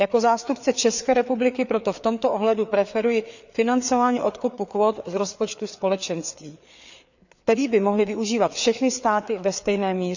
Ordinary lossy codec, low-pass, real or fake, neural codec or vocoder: none; 7.2 kHz; fake; codec, 16 kHz, 4 kbps, FreqCodec, larger model